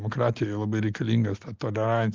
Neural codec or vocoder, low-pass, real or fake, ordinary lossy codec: none; 7.2 kHz; real; Opus, 32 kbps